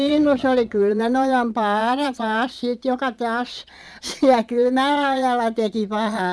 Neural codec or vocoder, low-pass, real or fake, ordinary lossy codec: vocoder, 22.05 kHz, 80 mel bands, Vocos; none; fake; none